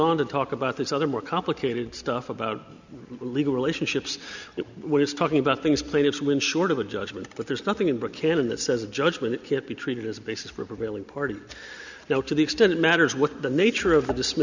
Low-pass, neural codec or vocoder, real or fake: 7.2 kHz; none; real